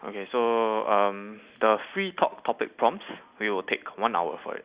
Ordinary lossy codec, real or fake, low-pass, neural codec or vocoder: Opus, 64 kbps; real; 3.6 kHz; none